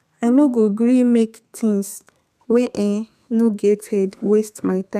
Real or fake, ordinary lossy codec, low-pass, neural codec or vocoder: fake; none; 14.4 kHz; codec, 32 kHz, 1.9 kbps, SNAC